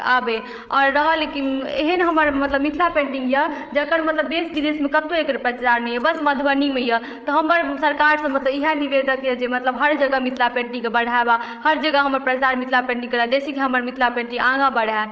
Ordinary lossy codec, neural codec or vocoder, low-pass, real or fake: none; codec, 16 kHz, 8 kbps, FreqCodec, larger model; none; fake